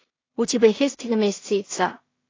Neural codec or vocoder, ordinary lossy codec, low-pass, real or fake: codec, 16 kHz in and 24 kHz out, 0.4 kbps, LongCat-Audio-Codec, two codebook decoder; AAC, 32 kbps; 7.2 kHz; fake